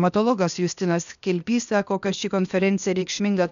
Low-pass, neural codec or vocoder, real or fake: 7.2 kHz; codec, 16 kHz, 0.8 kbps, ZipCodec; fake